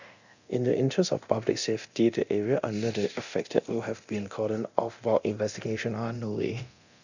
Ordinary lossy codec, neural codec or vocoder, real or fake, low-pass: none; codec, 24 kHz, 0.9 kbps, DualCodec; fake; 7.2 kHz